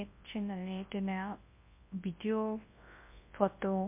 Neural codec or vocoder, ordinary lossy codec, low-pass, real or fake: codec, 24 kHz, 0.9 kbps, WavTokenizer, large speech release; MP3, 24 kbps; 3.6 kHz; fake